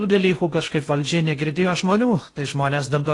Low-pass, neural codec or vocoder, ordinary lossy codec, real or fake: 10.8 kHz; codec, 16 kHz in and 24 kHz out, 0.6 kbps, FocalCodec, streaming, 4096 codes; AAC, 48 kbps; fake